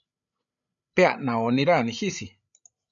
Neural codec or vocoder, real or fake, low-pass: codec, 16 kHz, 16 kbps, FreqCodec, larger model; fake; 7.2 kHz